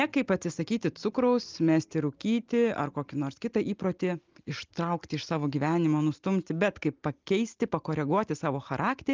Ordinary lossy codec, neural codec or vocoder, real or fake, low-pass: Opus, 24 kbps; none; real; 7.2 kHz